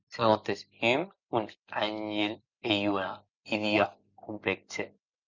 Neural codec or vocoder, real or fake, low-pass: none; real; 7.2 kHz